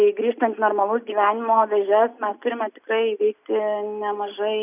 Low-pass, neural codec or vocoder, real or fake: 3.6 kHz; none; real